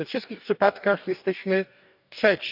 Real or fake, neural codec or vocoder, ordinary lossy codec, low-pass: fake; codec, 44.1 kHz, 2.6 kbps, DAC; none; 5.4 kHz